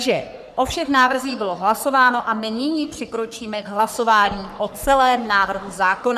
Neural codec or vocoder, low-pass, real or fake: codec, 44.1 kHz, 3.4 kbps, Pupu-Codec; 14.4 kHz; fake